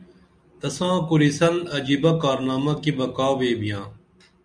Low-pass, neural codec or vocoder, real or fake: 9.9 kHz; none; real